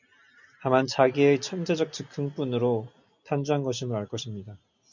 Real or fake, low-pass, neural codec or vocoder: real; 7.2 kHz; none